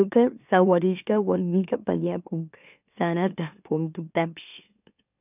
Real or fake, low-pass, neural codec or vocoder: fake; 3.6 kHz; autoencoder, 44.1 kHz, a latent of 192 numbers a frame, MeloTTS